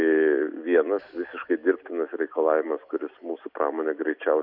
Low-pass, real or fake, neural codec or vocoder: 5.4 kHz; real; none